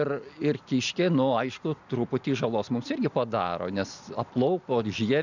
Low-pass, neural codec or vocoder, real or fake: 7.2 kHz; none; real